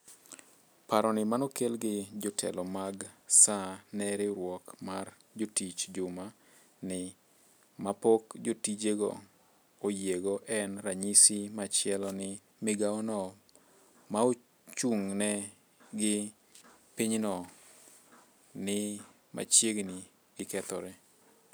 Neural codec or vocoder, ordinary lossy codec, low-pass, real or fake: none; none; none; real